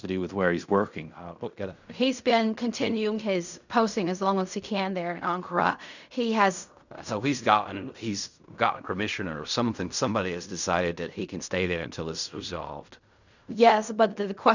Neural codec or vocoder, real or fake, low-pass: codec, 16 kHz in and 24 kHz out, 0.4 kbps, LongCat-Audio-Codec, fine tuned four codebook decoder; fake; 7.2 kHz